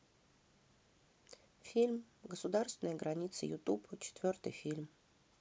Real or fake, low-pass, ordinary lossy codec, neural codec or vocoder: real; none; none; none